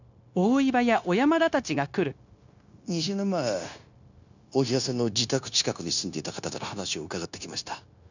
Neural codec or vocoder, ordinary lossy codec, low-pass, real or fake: codec, 16 kHz, 0.9 kbps, LongCat-Audio-Codec; none; 7.2 kHz; fake